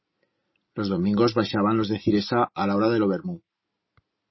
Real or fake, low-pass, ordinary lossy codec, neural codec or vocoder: real; 7.2 kHz; MP3, 24 kbps; none